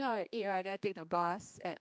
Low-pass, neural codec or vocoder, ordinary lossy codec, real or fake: none; codec, 16 kHz, 2 kbps, X-Codec, HuBERT features, trained on general audio; none; fake